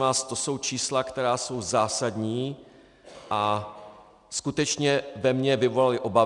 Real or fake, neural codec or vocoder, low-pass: real; none; 10.8 kHz